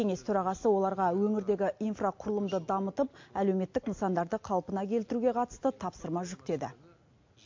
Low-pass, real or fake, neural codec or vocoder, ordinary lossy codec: 7.2 kHz; real; none; MP3, 48 kbps